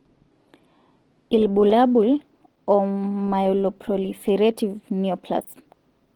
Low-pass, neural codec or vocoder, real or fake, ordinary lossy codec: 19.8 kHz; none; real; Opus, 16 kbps